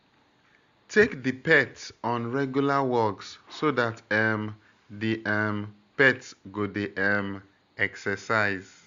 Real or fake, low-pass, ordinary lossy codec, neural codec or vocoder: real; 7.2 kHz; Opus, 64 kbps; none